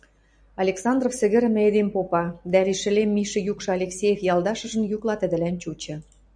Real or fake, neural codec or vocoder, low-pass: fake; vocoder, 44.1 kHz, 128 mel bands every 256 samples, BigVGAN v2; 9.9 kHz